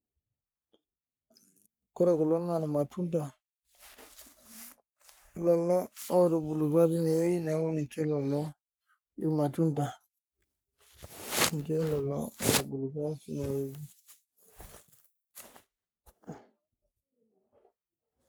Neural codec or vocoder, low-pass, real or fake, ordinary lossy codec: codec, 44.1 kHz, 3.4 kbps, Pupu-Codec; none; fake; none